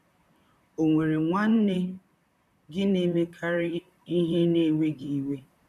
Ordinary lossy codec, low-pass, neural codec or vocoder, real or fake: none; 14.4 kHz; vocoder, 44.1 kHz, 128 mel bands, Pupu-Vocoder; fake